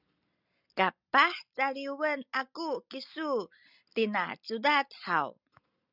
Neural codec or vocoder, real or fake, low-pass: none; real; 5.4 kHz